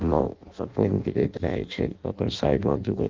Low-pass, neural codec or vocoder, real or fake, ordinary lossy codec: 7.2 kHz; codec, 16 kHz in and 24 kHz out, 0.6 kbps, FireRedTTS-2 codec; fake; Opus, 24 kbps